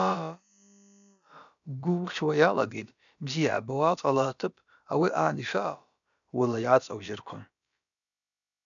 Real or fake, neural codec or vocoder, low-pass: fake; codec, 16 kHz, about 1 kbps, DyCAST, with the encoder's durations; 7.2 kHz